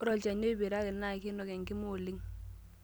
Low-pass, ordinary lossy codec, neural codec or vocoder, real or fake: none; none; none; real